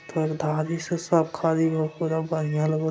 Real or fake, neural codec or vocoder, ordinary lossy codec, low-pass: real; none; none; none